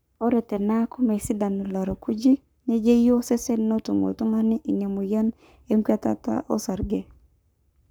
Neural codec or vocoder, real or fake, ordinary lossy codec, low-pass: codec, 44.1 kHz, 7.8 kbps, Pupu-Codec; fake; none; none